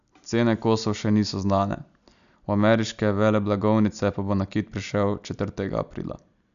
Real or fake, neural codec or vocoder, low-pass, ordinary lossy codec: real; none; 7.2 kHz; none